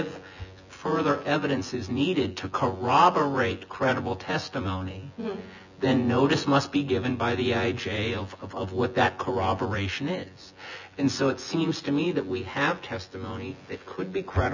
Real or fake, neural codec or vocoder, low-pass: fake; vocoder, 24 kHz, 100 mel bands, Vocos; 7.2 kHz